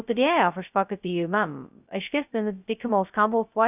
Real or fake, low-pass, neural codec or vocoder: fake; 3.6 kHz; codec, 16 kHz, 0.2 kbps, FocalCodec